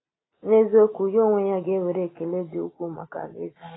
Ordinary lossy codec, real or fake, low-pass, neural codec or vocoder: AAC, 16 kbps; real; 7.2 kHz; none